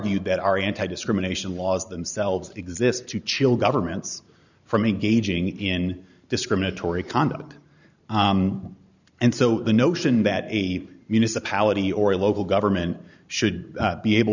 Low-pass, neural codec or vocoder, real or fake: 7.2 kHz; vocoder, 44.1 kHz, 128 mel bands every 512 samples, BigVGAN v2; fake